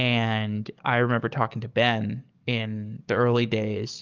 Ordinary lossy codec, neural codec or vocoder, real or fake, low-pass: Opus, 24 kbps; codec, 44.1 kHz, 7.8 kbps, DAC; fake; 7.2 kHz